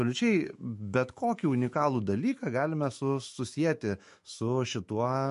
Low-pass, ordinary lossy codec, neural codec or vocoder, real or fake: 14.4 kHz; MP3, 48 kbps; autoencoder, 48 kHz, 128 numbers a frame, DAC-VAE, trained on Japanese speech; fake